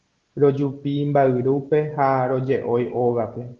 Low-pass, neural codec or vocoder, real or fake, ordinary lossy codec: 7.2 kHz; none; real; Opus, 16 kbps